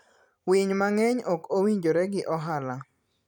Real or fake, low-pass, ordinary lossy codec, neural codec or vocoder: fake; 19.8 kHz; none; vocoder, 44.1 kHz, 128 mel bands every 256 samples, BigVGAN v2